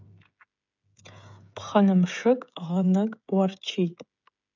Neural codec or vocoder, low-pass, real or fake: codec, 16 kHz, 16 kbps, FreqCodec, smaller model; 7.2 kHz; fake